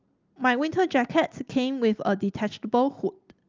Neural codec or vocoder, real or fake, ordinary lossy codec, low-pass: none; real; Opus, 24 kbps; 7.2 kHz